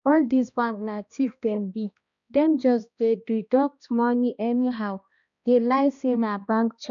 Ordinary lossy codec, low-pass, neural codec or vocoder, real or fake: none; 7.2 kHz; codec, 16 kHz, 1 kbps, X-Codec, HuBERT features, trained on balanced general audio; fake